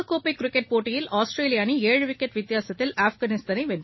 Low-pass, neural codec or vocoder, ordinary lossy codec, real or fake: 7.2 kHz; none; MP3, 24 kbps; real